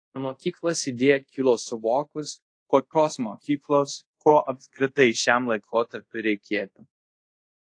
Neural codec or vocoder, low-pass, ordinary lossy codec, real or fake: codec, 24 kHz, 0.5 kbps, DualCodec; 9.9 kHz; AAC, 48 kbps; fake